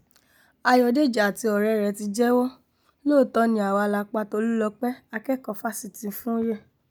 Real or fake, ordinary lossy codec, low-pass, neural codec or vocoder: real; none; none; none